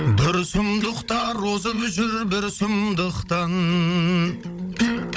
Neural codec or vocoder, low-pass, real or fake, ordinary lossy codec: codec, 16 kHz, 16 kbps, FunCodec, trained on Chinese and English, 50 frames a second; none; fake; none